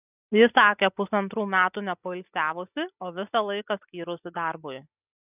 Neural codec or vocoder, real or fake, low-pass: none; real; 3.6 kHz